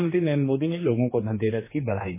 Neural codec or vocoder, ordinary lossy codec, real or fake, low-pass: codec, 16 kHz, 2 kbps, X-Codec, HuBERT features, trained on general audio; MP3, 16 kbps; fake; 3.6 kHz